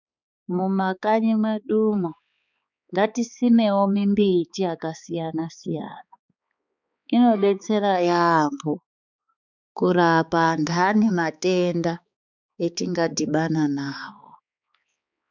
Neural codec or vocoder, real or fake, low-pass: codec, 16 kHz, 4 kbps, X-Codec, HuBERT features, trained on balanced general audio; fake; 7.2 kHz